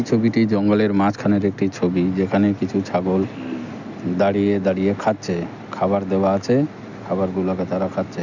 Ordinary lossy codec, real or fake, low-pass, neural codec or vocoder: none; real; 7.2 kHz; none